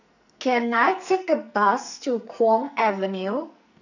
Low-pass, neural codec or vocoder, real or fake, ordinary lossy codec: 7.2 kHz; codec, 44.1 kHz, 2.6 kbps, SNAC; fake; none